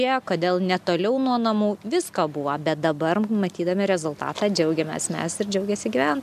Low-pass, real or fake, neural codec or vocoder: 14.4 kHz; real; none